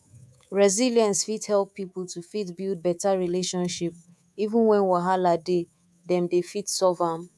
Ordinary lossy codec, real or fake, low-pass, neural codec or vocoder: none; fake; none; codec, 24 kHz, 3.1 kbps, DualCodec